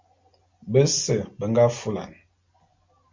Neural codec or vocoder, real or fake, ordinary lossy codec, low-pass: none; real; MP3, 48 kbps; 7.2 kHz